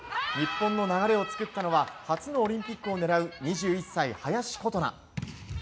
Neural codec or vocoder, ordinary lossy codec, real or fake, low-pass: none; none; real; none